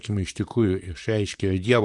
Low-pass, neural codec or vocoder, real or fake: 10.8 kHz; vocoder, 44.1 kHz, 128 mel bands, Pupu-Vocoder; fake